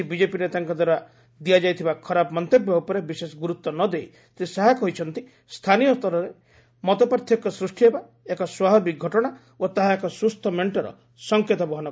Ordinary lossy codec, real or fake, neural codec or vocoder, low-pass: none; real; none; none